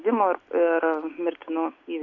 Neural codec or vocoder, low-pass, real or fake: none; 7.2 kHz; real